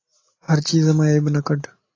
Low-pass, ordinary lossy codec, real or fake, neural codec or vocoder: 7.2 kHz; AAC, 32 kbps; real; none